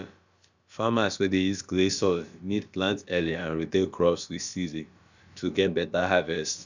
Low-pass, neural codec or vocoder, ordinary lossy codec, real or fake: 7.2 kHz; codec, 16 kHz, about 1 kbps, DyCAST, with the encoder's durations; Opus, 64 kbps; fake